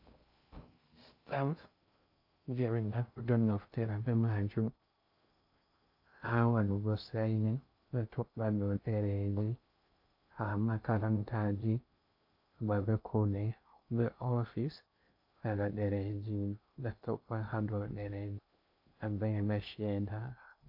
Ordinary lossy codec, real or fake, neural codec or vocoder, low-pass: AAC, 48 kbps; fake; codec, 16 kHz in and 24 kHz out, 0.6 kbps, FocalCodec, streaming, 2048 codes; 5.4 kHz